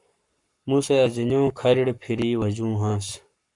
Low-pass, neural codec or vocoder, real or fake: 10.8 kHz; codec, 44.1 kHz, 7.8 kbps, Pupu-Codec; fake